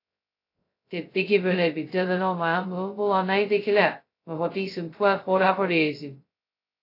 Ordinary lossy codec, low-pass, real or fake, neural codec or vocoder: AAC, 32 kbps; 5.4 kHz; fake; codec, 16 kHz, 0.2 kbps, FocalCodec